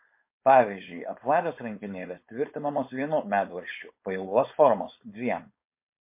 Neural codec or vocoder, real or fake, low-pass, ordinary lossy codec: codec, 16 kHz, 4.8 kbps, FACodec; fake; 3.6 kHz; MP3, 24 kbps